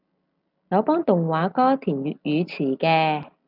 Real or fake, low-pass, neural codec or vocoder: real; 5.4 kHz; none